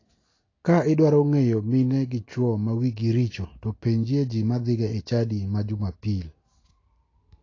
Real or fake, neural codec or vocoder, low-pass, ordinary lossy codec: real; none; 7.2 kHz; AAC, 32 kbps